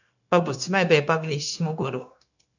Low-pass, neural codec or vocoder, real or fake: 7.2 kHz; codec, 16 kHz, 0.9 kbps, LongCat-Audio-Codec; fake